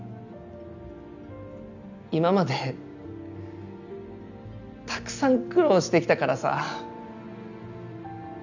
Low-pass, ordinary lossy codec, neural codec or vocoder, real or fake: 7.2 kHz; none; none; real